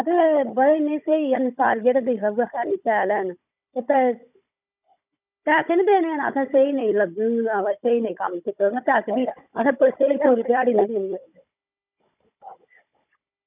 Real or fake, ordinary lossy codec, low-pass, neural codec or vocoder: fake; none; 3.6 kHz; codec, 16 kHz, 16 kbps, FunCodec, trained on Chinese and English, 50 frames a second